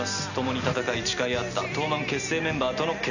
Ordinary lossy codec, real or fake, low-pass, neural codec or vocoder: none; real; 7.2 kHz; none